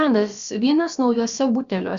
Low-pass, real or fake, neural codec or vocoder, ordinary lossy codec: 7.2 kHz; fake; codec, 16 kHz, about 1 kbps, DyCAST, with the encoder's durations; Opus, 64 kbps